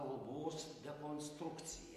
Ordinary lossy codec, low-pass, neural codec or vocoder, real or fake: MP3, 64 kbps; 14.4 kHz; none; real